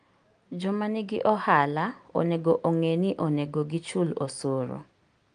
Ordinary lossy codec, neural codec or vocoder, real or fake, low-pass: Opus, 32 kbps; none; real; 9.9 kHz